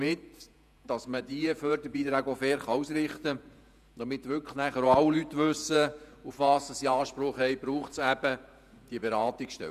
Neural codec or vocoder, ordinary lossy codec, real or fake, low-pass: vocoder, 48 kHz, 128 mel bands, Vocos; none; fake; 14.4 kHz